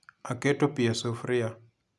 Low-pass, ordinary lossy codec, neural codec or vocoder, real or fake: none; none; none; real